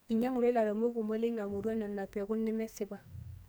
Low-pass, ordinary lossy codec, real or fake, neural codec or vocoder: none; none; fake; codec, 44.1 kHz, 2.6 kbps, SNAC